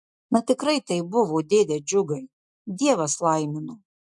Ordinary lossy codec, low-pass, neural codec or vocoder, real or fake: MP3, 64 kbps; 10.8 kHz; none; real